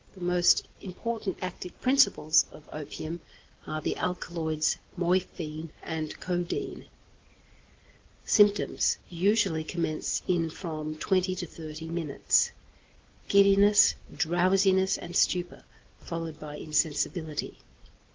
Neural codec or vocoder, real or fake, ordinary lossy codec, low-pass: none; real; Opus, 16 kbps; 7.2 kHz